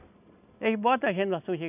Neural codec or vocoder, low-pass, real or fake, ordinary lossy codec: none; 3.6 kHz; real; none